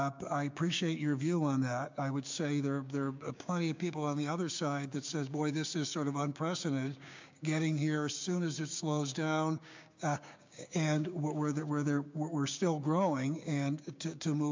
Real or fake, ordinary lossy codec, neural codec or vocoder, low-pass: fake; MP3, 64 kbps; codec, 16 kHz, 6 kbps, DAC; 7.2 kHz